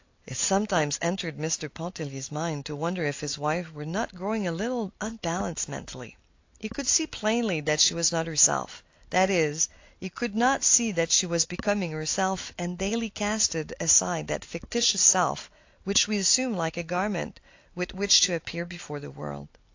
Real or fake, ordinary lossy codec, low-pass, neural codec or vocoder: real; AAC, 48 kbps; 7.2 kHz; none